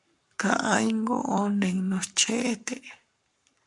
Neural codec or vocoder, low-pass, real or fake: codec, 44.1 kHz, 7.8 kbps, Pupu-Codec; 10.8 kHz; fake